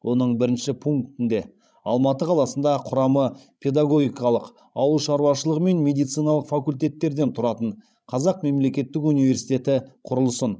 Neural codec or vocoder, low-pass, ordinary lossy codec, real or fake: codec, 16 kHz, 16 kbps, FreqCodec, larger model; none; none; fake